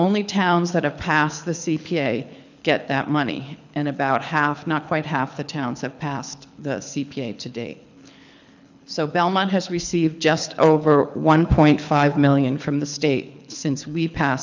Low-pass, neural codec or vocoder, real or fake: 7.2 kHz; codec, 24 kHz, 6 kbps, HILCodec; fake